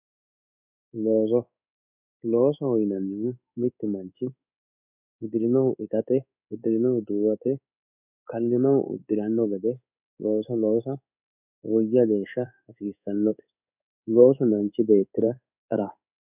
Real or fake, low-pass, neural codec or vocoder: fake; 3.6 kHz; codec, 16 kHz in and 24 kHz out, 1 kbps, XY-Tokenizer